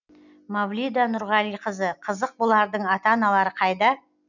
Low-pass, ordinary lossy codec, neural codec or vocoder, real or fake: 7.2 kHz; none; none; real